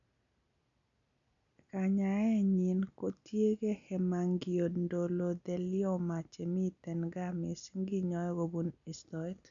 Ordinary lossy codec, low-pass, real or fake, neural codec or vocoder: none; 7.2 kHz; real; none